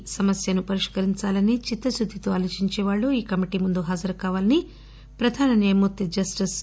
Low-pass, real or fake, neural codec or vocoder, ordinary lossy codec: none; real; none; none